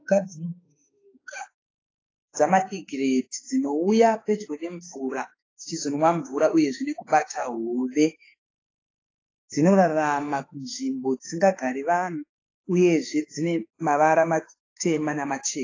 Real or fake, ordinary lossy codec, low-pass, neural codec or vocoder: fake; AAC, 32 kbps; 7.2 kHz; autoencoder, 48 kHz, 32 numbers a frame, DAC-VAE, trained on Japanese speech